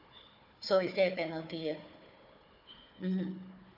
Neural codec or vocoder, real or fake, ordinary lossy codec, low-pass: codec, 16 kHz, 4 kbps, FunCodec, trained on Chinese and English, 50 frames a second; fake; none; 5.4 kHz